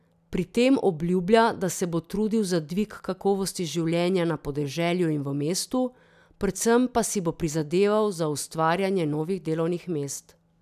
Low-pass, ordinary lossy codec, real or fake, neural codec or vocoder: 14.4 kHz; none; real; none